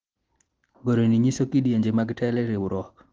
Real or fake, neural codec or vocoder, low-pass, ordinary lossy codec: real; none; 7.2 kHz; Opus, 16 kbps